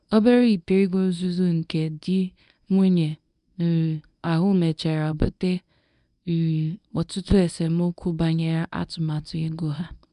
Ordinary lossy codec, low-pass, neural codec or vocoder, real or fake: none; 10.8 kHz; codec, 24 kHz, 0.9 kbps, WavTokenizer, medium speech release version 1; fake